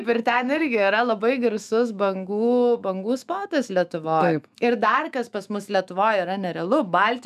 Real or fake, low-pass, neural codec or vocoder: fake; 14.4 kHz; autoencoder, 48 kHz, 128 numbers a frame, DAC-VAE, trained on Japanese speech